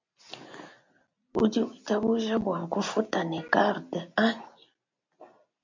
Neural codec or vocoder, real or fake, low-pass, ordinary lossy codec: none; real; 7.2 kHz; MP3, 64 kbps